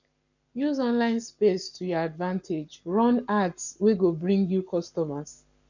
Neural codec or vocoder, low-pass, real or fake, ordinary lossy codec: codec, 44.1 kHz, 7.8 kbps, Pupu-Codec; 7.2 kHz; fake; none